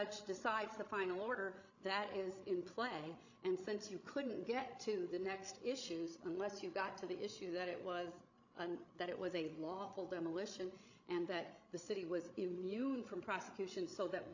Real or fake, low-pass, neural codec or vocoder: fake; 7.2 kHz; codec, 16 kHz, 16 kbps, FreqCodec, larger model